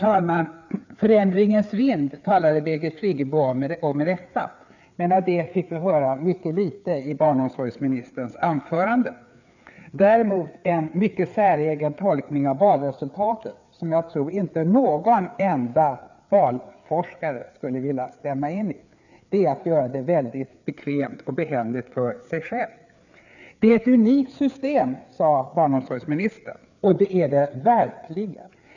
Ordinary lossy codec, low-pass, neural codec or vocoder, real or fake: none; 7.2 kHz; codec, 16 kHz, 4 kbps, FreqCodec, larger model; fake